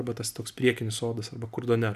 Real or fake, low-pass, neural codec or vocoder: real; 14.4 kHz; none